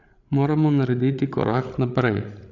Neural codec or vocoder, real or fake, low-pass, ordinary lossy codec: codec, 16 kHz, 8 kbps, FreqCodec, larger model; fake; 7.2 kHz; none